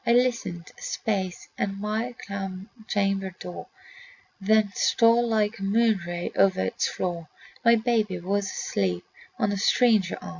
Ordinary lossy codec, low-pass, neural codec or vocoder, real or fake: Opus, 64 kbps; 7.2 kHz; none; real